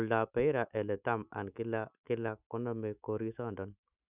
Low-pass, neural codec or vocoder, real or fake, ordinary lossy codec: 3.6 kHz; autoencoder, 48 kHz, 128 numbers a frame, DAC-VAE, trained on Japanese speech; fake; none